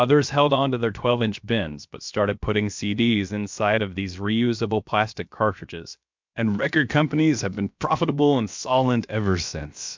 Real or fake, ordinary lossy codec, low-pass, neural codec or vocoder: fake; MP3, 64 kbps; 7.2 kHz; codec, 16 kHz, about 1 kbps, DyCAST, with the encoder's durations